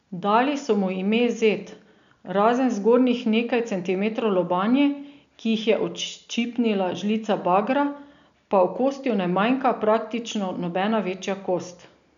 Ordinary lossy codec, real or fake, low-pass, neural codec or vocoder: none; real; 7.2 kHz; none